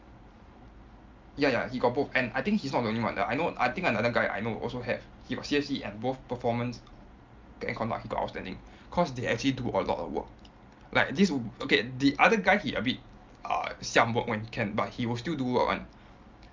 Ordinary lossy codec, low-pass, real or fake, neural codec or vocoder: Opus, 24 kbps; 7.2 kHz; real; none